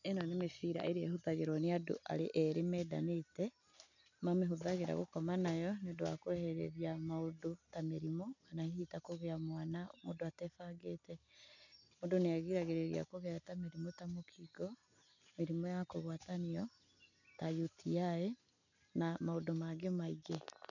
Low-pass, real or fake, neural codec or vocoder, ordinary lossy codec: 7.2 kHz; real; none; none